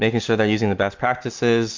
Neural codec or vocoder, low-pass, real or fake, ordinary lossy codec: none; 7.2 kHz; real; MP3, 64 kbps